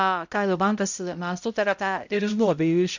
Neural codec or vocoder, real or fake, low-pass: codec, 16 kHz, 0.5 kbps, X-Codec, HuBERT features, trained on balanced general audio; fake; 7.2 kHz